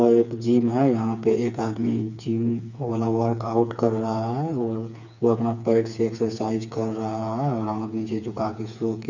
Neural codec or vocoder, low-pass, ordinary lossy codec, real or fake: codec, 16 kHz, 4 kbps, FreqCodec, smaller model; 7.2 kHz; none; fake